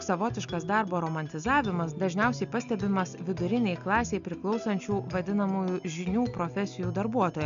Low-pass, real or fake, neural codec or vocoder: 7.2 kHz; real; none